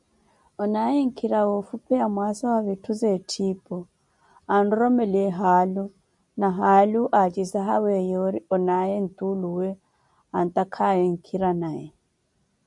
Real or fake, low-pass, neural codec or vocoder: real; 10.8 kHz; none